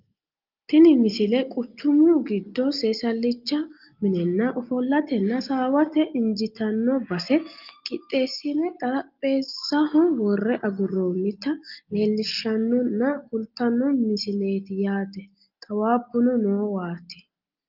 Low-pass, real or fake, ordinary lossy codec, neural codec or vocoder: 5.4 kHz; real; Opus, 24 kbps; none